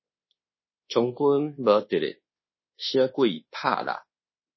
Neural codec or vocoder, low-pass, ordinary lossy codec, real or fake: codec, 24 kHz, 1.2 kbps, DualCodec; 7.2 kHz; MP3, 24 kbps; fake